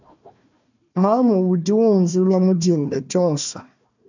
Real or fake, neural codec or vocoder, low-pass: fake; codec, 16 kHz, 1 kbps, FunCodec, trained on Chinese and English, 50 frames a second; 7.2 kHz